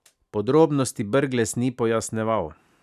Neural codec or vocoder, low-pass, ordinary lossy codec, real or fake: none; 14.4 kHz; none; real